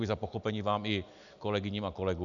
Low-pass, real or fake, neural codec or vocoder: 7.2 kHz; real; none